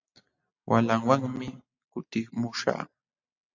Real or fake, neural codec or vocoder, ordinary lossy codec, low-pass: real; none; AAC, 48 kbps; 7.2 kHz